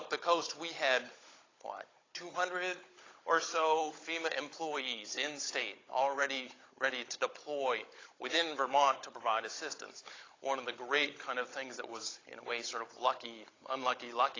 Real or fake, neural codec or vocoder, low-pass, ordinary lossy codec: fake; codec, 16 kHz, 16 kbps, FunCodec, trained on Chinese and English, 50 frames a second; 7.2 kHz; AAC, 32 kbps